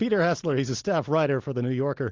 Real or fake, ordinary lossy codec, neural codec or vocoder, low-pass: real; Opus, 32 kbps; none; 7.2 kHz